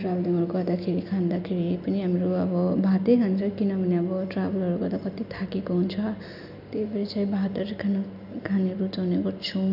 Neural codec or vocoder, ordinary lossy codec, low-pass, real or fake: none; none; 5.4 kHz; real